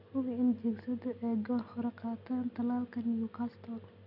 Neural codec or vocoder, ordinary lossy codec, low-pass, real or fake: none; none; 5.4 kHz; real